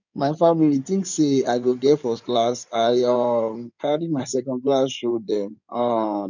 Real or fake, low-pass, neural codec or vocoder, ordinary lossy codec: fake; 7.2 kHz; codec, 16 kHz in and 24 kHz out, 2.2 kbps, FireRedTTS-2 codec; none